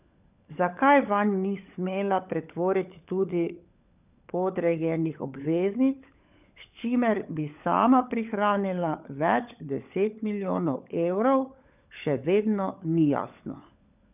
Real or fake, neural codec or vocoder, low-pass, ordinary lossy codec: fake; codec, 16 kHz, 16 kbps, FunCodec, trained on LibriTTS, 50 frames a second; 3.6 kHz; none